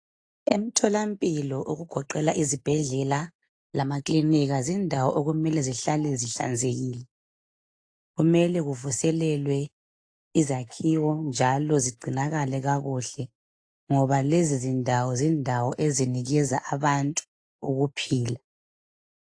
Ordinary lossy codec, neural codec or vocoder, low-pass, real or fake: AAC, 48 kbps; none; 9.9 kHz; real